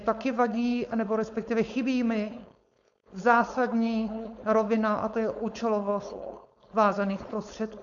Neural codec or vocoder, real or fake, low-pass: codec, 16 kHz, 4.8 kbps, FACodec; fake; 7.2 kHz